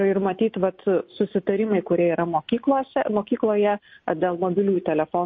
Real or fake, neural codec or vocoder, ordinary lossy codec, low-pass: real; none; MP3, 32 kbps; 7.2 kHz